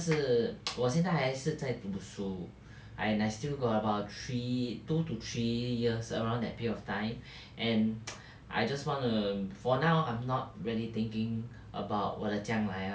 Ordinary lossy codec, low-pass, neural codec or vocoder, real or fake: none; none; none; real